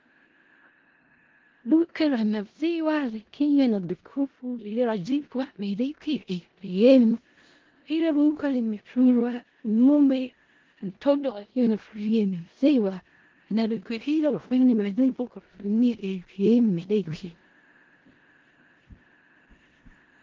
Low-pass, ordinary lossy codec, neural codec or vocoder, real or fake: 7.2 kHz; Opus, 16 kbps; codec, 16 kHz in and 24 kHz out, 0.4 kbps, LongCat-Audio-Codec, four codebook decoder; fake